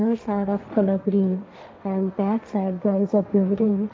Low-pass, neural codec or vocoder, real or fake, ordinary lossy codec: none; codec, 16 kHz, 1.1 kbps, Voila-Tokenizer; fake; none